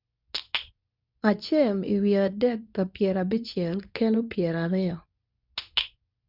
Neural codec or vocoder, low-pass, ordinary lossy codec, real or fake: codec, 24 kHz, 0.9 kbps, WavTokenizer, medium speech release version 2; 5.4 kHz; none; fake